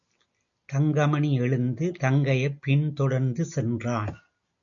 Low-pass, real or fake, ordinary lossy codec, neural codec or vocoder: 7.2 kHz; real; AAC, 64 kbps; none